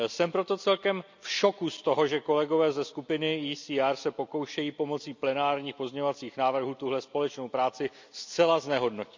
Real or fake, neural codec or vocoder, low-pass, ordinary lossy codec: real; none; 7.2 kHz; MP3, 64 kbps